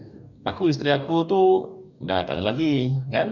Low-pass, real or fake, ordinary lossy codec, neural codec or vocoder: 7.2 kHz; fake; none; codec, 44.1 kHz, 2.6 kbps, DAC